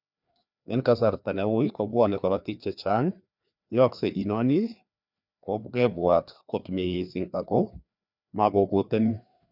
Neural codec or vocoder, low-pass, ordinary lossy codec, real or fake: codec, 16 kHz, 2 kbps, FreqCodec, larger model; 5.4 kHz; none; fake